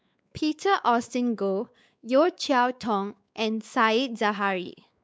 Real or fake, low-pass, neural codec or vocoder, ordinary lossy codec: fake; none; codec, 16 kHz, 4 kbps, X-Codec, WavLM features, trained on Multilingual LibriSpeech; none